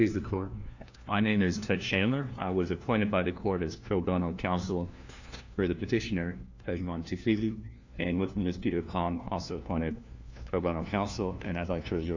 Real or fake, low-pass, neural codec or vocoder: fake; 7.2 kHz; codec, 16 kHz, 1 kbps, FunCodec, trained on LibriTTS, 50 frames a second